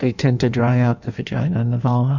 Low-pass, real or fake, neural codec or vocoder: 7.2 kHz; fake; codec, 16 kHz in and 24 kHz out, 1.1 kbps, FireRedTTS-2 codec